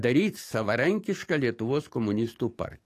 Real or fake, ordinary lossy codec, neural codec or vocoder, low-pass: fake; AAC, 48 kbps; codec, 44.1 kHz, 7.8 kbps, DAC; 14.4 kHz